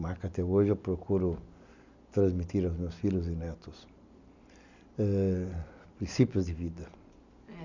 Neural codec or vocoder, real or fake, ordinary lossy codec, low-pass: none; real; none; 7.2 kHz